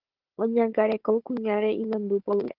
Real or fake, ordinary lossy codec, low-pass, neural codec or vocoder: fake; Opus, 16 kbps; 5.4 kHz; codec, 16 kHz, 16 kbps, FunCodec, trained on Chinese and English, 50 frames a second